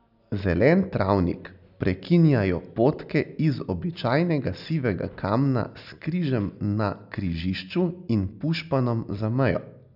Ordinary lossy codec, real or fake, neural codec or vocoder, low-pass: none; real; none; 5.4 kHz